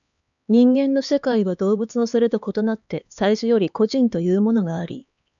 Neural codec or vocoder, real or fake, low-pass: codec, 16 kHz, 4 kbps, X-Codec, HuBERT features, trained on LibriSpeech; fake; 7.2 kHz